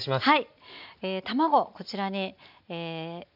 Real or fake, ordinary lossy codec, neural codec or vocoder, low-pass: real; none; none; 5.4 kHz